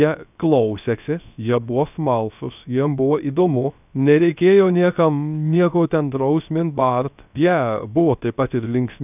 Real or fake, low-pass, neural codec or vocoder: fake; 3.6 kHz; codec, 16 kHz, 0.3 kbps, FocalCodec